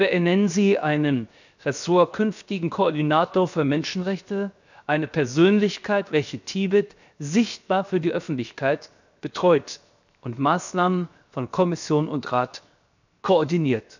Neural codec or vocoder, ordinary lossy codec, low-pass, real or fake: codec, 16 kHz, 0.7 kbps, FocalCodec; none; 7.2 kHz; fake